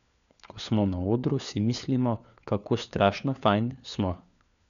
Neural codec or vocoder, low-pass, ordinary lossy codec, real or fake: codec, 16 kHz, 4 kbps, FunCodec, trained on LibriTTS, 50 frames a second; 7.2 kHz; none; fake